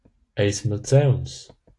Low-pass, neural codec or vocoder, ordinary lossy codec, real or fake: 10.8 kHz; none; AAC, 48 kbps; real